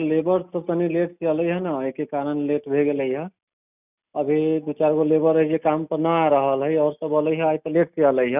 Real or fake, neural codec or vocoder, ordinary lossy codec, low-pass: real; none; none; 3.6 kHz